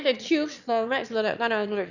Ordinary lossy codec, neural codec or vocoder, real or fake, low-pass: none; autoencoder, 22.05 kHz, a latent of 192 numbers a frame, VITS, trained on one speaker; fake; 7.2 kHz